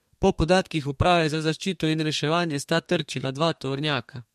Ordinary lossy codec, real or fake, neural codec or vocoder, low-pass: MP3, 64 kbps; fake; codec, 32 kHz, 1.9 kbps, SNAC; 14.4 kHz